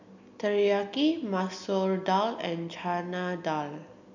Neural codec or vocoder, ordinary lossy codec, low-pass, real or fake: none; none; 7.2 kHz; real